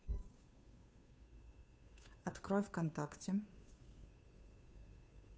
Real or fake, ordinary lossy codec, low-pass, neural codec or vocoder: fake; none; none; codec, 16 kHz, 2 kbps, FunCodec, trained on Chinese and English, 25 frames a second